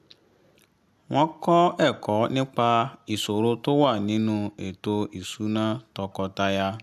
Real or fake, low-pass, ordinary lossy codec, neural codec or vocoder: real; 14.4 kHz; none; none